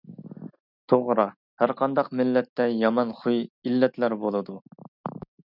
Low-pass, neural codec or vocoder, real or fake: 5.4 kHz; none; real